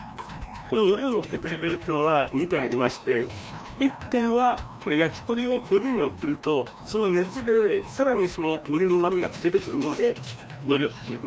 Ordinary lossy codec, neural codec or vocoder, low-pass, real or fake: none; codec, 16 kHz, 1 kbps, FreqCodec, larger model; none; fake